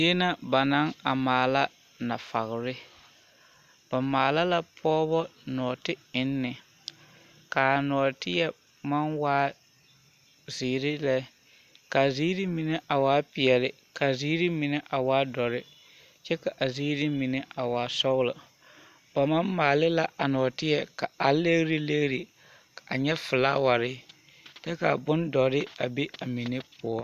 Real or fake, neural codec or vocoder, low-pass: real; none; 14.4 kHz